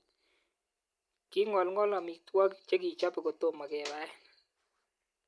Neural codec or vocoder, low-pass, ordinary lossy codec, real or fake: none; none; none; real